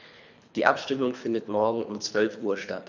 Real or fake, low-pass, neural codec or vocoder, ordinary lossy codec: fake; 7.2 kHz; codec, 24 kHz, 3 kbps, HILCodec; none